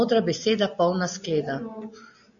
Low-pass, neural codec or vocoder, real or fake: 7.2 kHz; none; real